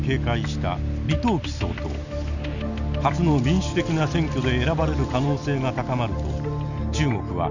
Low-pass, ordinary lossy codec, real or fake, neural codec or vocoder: 7.2 kHz; none; real; none